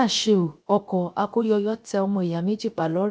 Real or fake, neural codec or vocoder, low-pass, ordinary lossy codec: fake; codec, 16 kHz, 0.7 kbps, FocalCodec; none; none